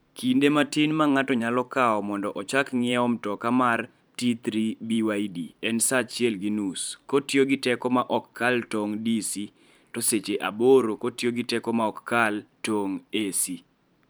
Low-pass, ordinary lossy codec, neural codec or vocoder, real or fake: none; none; vocoder, 44.1 kHz, 128 mel bands every 512 samples, BigVGAN v2; fake